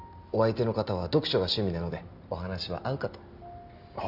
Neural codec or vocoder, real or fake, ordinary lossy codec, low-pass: none; real; none; 5.4 kHz